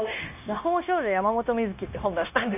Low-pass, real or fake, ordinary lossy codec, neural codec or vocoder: 3.6 kHz; fake; none; codec, 16 kHz, 0.9 kbps, LongCat-Audio-Codec